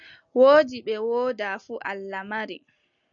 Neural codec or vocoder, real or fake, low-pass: none; real; 7.2 kHz